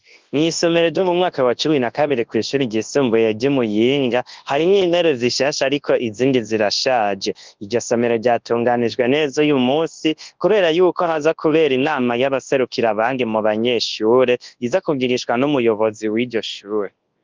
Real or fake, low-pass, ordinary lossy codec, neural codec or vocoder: fake; 7.2 kHz; Opus, 32 kbps; codec, 24 kHz, 0.9 kbps, WavTokenizer, large speech release